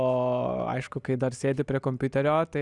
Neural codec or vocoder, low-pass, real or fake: none; 10.8 kHz; real